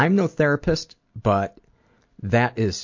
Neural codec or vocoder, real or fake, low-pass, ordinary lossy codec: none; real; 7.2 kHz; MP3, 48 kbps